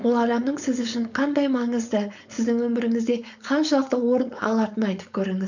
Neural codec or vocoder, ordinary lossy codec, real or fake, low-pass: codec, 16 kHz, 4.8 kbps, FACodec; none; fake; 7.2 kHz